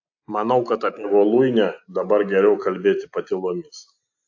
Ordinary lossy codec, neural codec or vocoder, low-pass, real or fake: AAC, 48 kbps; none; 7.2 kHz; real